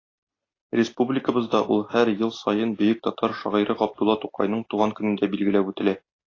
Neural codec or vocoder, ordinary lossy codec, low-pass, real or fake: none; AAC, 32 kbps; 7.2 kHz; real